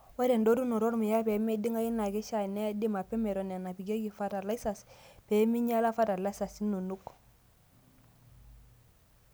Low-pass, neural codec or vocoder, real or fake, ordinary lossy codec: none; none; real; none